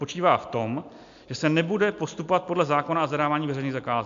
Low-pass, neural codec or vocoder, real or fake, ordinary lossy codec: 7.2 kHz; none; real; AAC, 64 kbps